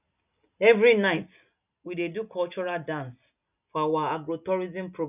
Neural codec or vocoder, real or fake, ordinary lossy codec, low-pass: none; real; none; 3.6 kHz